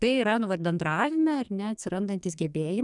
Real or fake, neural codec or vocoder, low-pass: fake; codec, 44.1 kHz, 2.6 kbps, SNAC; 10.8 kHz